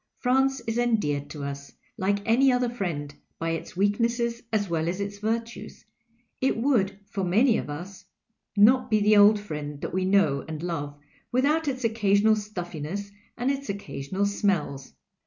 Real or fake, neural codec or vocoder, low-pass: real; none; 7.2 kHz